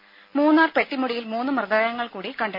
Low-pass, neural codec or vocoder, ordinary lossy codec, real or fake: 5.4 kHz; none; MP3, 32 kbps; real